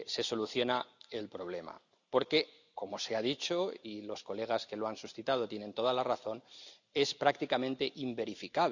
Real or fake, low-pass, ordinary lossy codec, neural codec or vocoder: real; 7.2 kHz; none; none